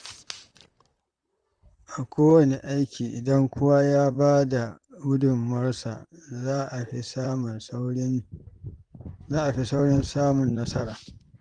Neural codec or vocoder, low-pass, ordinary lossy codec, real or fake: vocoder, 44.1 kHz, 128 mel bands, Pupu-Vocoder; 9.9 kHz; Opus, 32 kbps; fake